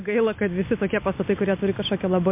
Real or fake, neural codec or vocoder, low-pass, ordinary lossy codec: real; none; 3.6 kHz; MP3, 24 kbps